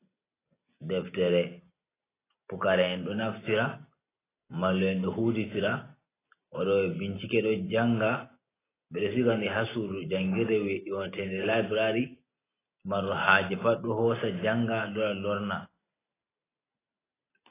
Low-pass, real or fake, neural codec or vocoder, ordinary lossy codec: 3.6 kHz; real; none; AAC, 16 kbps